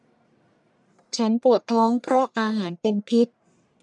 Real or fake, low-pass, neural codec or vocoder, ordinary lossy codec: fake; 10.8 kHz; codec, 44.1 kHz, 1.7 kbps, Pupu-Codec; none